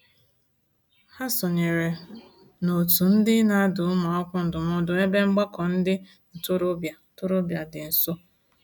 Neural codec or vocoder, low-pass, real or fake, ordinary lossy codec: none; none; real; none